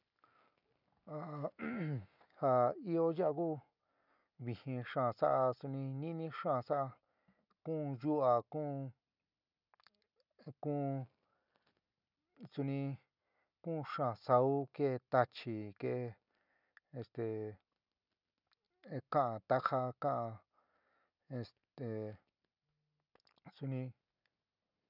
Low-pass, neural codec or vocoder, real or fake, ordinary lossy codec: 5.4 kHz; none; real; none